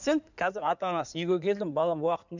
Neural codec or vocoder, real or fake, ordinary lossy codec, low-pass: codec, 16 kHz in and 24 kHz out, 2.2 kbps, FireRedTTS-2 codec; fake; none; 7.2 kHz